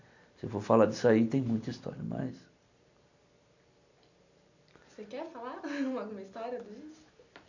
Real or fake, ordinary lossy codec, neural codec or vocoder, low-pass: real; none; none; 7.2 kHz